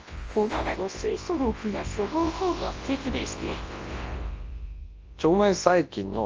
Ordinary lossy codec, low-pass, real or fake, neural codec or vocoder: Opus, 24 kbps; 7.2 kHz; fake; codec, 24 kHz, 0.9 kbps, WavTokenizer, large speech release